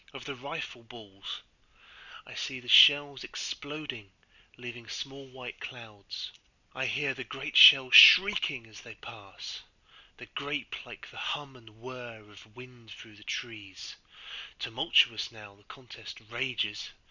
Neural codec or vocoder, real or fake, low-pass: none; real; 7.2 kHz